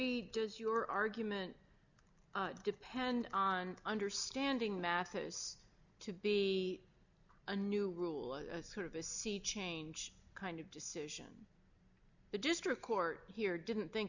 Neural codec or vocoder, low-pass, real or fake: none; 7.2 kHz; real